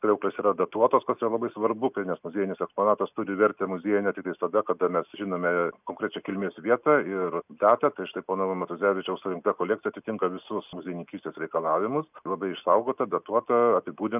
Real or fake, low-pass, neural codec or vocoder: real; 3.6 kHz; none